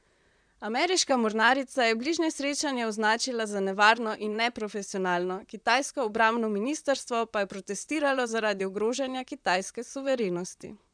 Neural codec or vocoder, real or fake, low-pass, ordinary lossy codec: vocoder, 44.1 kHz, 128 mel bands, Pupu-Vocoder; fake; 9.9 kHz; none